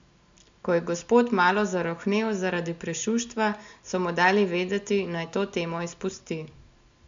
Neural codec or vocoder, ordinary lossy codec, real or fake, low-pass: none; MP3, 64 kbps; real; 7.2 kHz